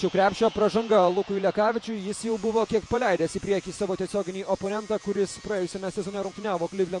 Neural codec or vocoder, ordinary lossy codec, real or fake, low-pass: vocoder, 48 kHz, 128 mel bands, Vocos; MP3, 48 kbps; fake; 10.8 kHz